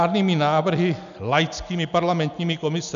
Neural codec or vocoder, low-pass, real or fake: none; 7.2 kHz; real